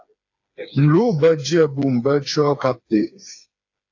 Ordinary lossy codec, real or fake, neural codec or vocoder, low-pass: AAC, 48 kbps; fake; codec, 16 kHz, 4 kbps, FreqCodec, smaller model; 7.2 kHz